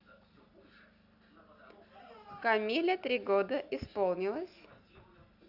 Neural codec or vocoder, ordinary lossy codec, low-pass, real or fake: none; Opus, 64 kbps; 5.4 kHz; real